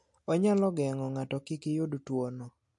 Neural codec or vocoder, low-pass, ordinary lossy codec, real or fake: none; 10.8 kHz; MP3, 48 kbps; real